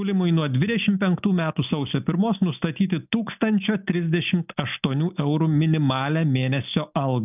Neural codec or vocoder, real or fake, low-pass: none; real; 3.6 kHz